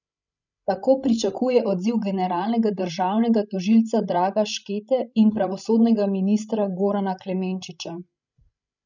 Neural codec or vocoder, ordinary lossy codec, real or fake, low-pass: codec, 16 kHz, 16 kbps, FreqCodec, larger model; none; fake; 7.2 kHz